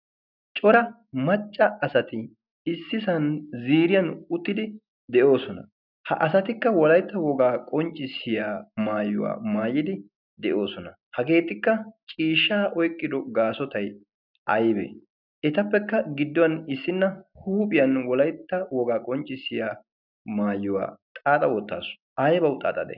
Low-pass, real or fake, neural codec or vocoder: 5.4 kHz; real; none